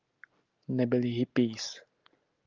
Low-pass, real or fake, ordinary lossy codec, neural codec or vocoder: 7.2 kHz; real; Opus, 32 kbps; none